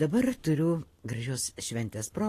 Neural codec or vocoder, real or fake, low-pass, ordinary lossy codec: none; real; 14.4 kHz; AAC, 48 kbps